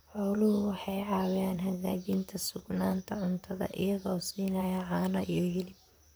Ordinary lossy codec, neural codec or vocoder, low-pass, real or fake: none; vocoder, 44.1 kHz, 128 mel bands, Pupu-Vocoder; none; fake